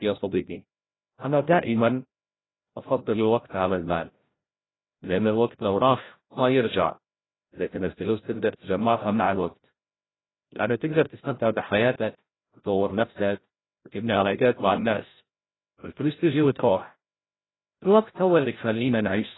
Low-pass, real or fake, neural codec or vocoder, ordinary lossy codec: 7.2 kHz; fake; codec, 16 kHz, 0.5 kbps, FreqCodec, larger model; AAC, 16 kbps